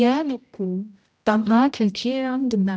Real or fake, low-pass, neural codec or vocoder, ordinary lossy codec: fake; none; codec, 16 kHz, 0.5 kbps, X-Codec, HuBERT features, trained on general audio; none